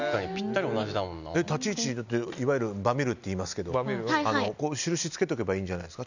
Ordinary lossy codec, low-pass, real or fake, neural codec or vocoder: none; 7.2 kHz; real; none